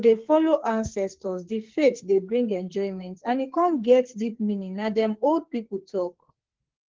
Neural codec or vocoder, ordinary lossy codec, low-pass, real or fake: codec, 44.1 kHz, 2.6 kbps, SNAC; Opus, 16 kbps; 7.2 kHz; fake